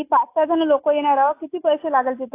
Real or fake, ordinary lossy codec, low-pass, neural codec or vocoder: real; AAC, 24 kbps; 3.6 kHz; none